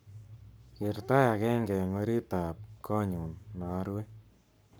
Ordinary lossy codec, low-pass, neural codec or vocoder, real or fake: none; none; codec, 44.1 kHz, 7.8 kbps, Pupu-Codec; fake